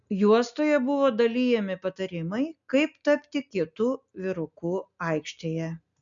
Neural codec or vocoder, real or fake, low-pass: none; real; 7.2 kHz